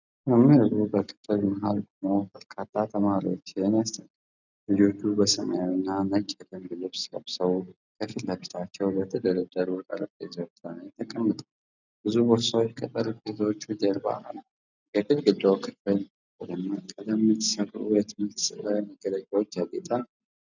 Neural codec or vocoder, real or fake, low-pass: none; real; 7.2 kHz